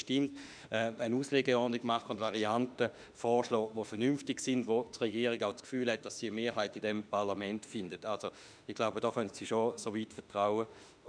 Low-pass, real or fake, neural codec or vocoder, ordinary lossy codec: 9.9 kHz; fake; autoencoder, 48 kHz, 32 numbers a frame, DAC-VAE, trained on Japanese speech; none